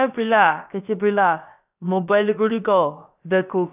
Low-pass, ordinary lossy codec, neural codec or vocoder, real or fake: 3.6 kHz; none; codec, 16 kHz, about 1 kbps, DyCAST, with the encoder's durations; fake